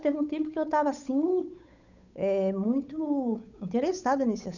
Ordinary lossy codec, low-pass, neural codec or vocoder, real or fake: none; 7.2 kHz; codec, 16 kHz, 8 kbps, FunCodec, trained on Chinese and English, 25 frames a second; fake